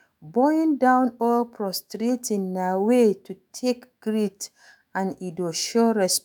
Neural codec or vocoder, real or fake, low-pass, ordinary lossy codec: autoencoder, 48 kHz, 128 numbers a frame, DAC-VAE, trained on Japanese speech; fake; none; none